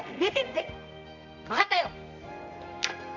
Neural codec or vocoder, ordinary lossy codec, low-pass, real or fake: codec, 24 kHz, 0.9 kbps, WavTokenizer, medium music audio release; none; 7.2 kHz; fake